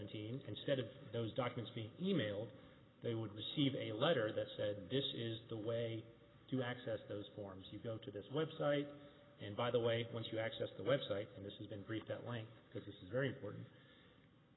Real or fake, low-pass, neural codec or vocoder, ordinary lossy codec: real; 7.2 kHz; none; AAC, 16 kbps